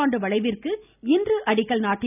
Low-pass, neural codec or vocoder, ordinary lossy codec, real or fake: 3.6 kHz; none; none; real